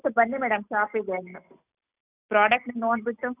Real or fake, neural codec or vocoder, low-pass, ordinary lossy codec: real; none; 3.6 kHz; none